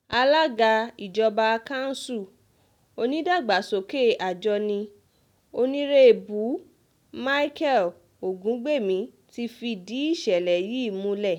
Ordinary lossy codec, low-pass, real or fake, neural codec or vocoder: none; 19.8 kHz; real; none